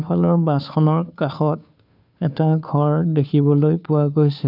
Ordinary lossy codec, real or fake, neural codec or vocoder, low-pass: none; fake; codec, 16 kHz, 2 kbps, FunCodec, trained on Chinese and English, 25 frames a second; 5.4 kHz